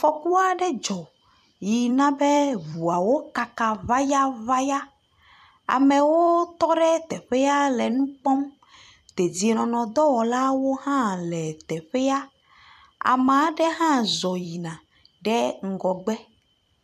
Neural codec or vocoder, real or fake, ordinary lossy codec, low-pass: vocoder, 44.1 kHz, 128 mel bands every 256 samples, BigVGAN v2; fake; MP3, 96 kbps; 14.4 kHz